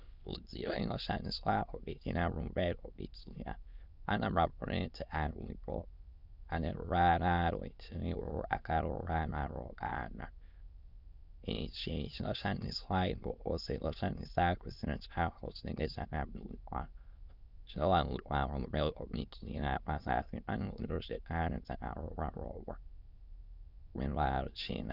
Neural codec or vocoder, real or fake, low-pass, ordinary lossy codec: autoencoder, 22.05 kHz, a latent of 192 numbers a frame, VITS, trained on many speakers; fake; 5.4 kHz; Opus, 64 kbps